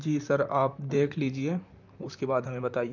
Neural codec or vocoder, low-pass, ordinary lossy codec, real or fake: vocoder, 44.1 kHz, 128 mel bands, Pupu-Vocoder; 7.2 kHz; none; fake